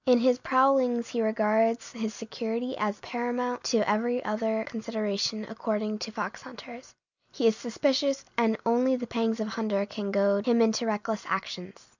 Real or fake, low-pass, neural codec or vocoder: real; 7.2 kHz; none